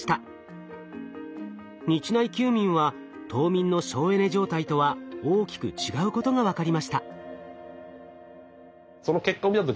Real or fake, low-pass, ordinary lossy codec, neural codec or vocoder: real; none; none; none